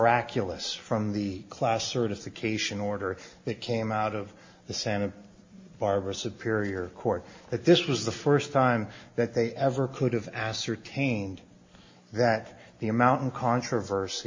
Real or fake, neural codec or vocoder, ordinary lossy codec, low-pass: real; none; MP3, 32 kbps; 7.2 kHz